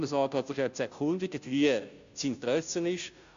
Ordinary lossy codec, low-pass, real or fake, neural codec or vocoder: AAC, 64 kbps; 7.2 kHz; fake; codec, 16 kHz, 0.5 kbps, FunCodec, trained on Chinese and English, 25 frames a second